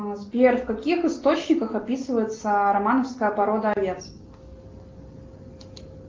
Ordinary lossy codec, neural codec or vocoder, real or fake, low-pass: Opus, 32 kbps; none; real; 7.2 kHz